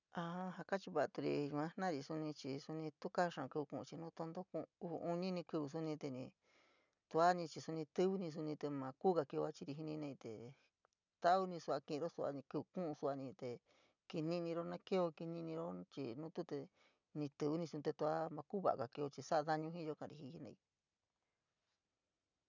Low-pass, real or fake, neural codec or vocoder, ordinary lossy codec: 7.2 kHz; real; none; none